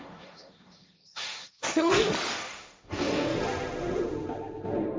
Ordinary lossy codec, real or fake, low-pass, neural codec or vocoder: none; fake; none; codec, 16 kHz, 1.1 kbps, Voila-Tokenizer